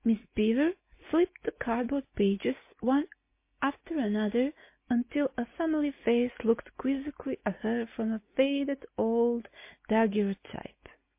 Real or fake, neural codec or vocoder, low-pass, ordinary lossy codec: real; none; 3.6 kHz; MP3, 24 kbps